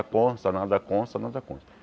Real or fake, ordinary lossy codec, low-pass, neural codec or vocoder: real; none; none; none